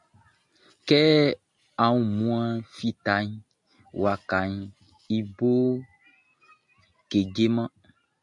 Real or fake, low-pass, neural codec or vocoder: real; 10.8 kHz; none